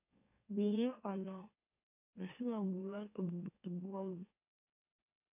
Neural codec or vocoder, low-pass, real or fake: autoencoder, 44.1 kHz, a latent of 192 numbers a frame, MeloTTS; 3.6 kHz; fake